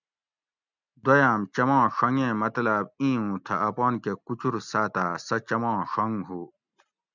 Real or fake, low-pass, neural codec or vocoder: real; 7.2 kHz; none